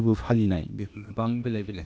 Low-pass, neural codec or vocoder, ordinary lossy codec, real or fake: none; codec, 16 kHz, 0.8 kbps, ZipCodec; none; fake